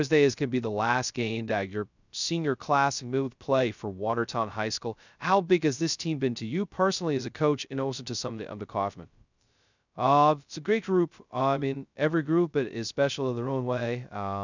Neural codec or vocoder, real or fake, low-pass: codec, 16 kHz, 0.2 kbps, FocalCodec; fake; 7.2 kHz